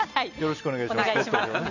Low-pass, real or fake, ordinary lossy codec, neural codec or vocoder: 7.2 kHz; real; none; none